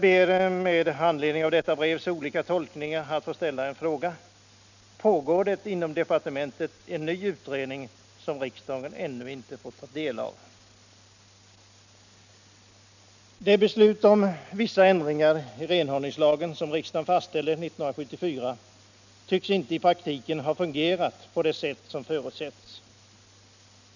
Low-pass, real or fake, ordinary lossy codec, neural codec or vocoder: 7.2 kHz; real; none; none